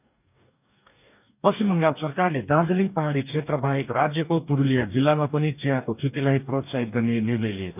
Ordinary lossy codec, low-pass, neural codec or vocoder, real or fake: none; 3.6 kHz; codec, 44.1 kHz, 2.6 kbps, DAC; fake